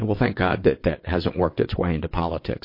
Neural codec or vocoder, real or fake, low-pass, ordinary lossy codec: vocoder, 22.05 kHz, 80 mel bands, WaveNeXt; fake; 5.4 kHz; MP3, 32 kbps